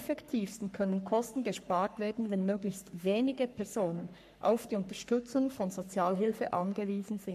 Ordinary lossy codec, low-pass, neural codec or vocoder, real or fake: MP3, 64 kbps; 14.4 kHz; codec, 44.1 kHz, 3.4 kbps, Pupu-Codec; fake